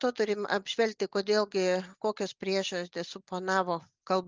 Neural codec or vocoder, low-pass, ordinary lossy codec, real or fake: none; 7.2 kHz; Opus, 24 kbps; real